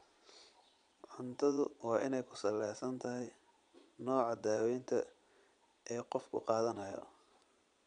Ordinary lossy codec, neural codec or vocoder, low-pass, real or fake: none; vocoder, 22.05 kHz, 80 mel bands, Vocos; 9.9 kHz; fake